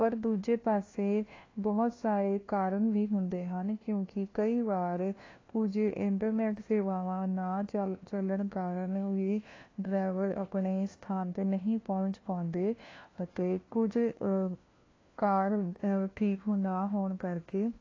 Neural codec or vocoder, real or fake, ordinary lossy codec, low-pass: codec, 16 kHz, 1 kbps, FunCodec, trained on LibriTTS, 50 frames a second; fake; AAC, 32 kbps; 7.2 kHz